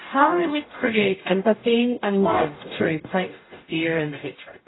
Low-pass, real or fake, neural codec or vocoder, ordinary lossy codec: 7.2 kHz; fake; codec, 44.1 kHz, 0.9 kbps, DAC; AAC, 16 kbps